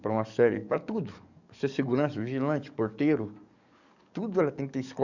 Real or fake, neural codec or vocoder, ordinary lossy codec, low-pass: fake; codec, 44.1 kHz, 7.8 kbps, DAC; none; 7.2 kHz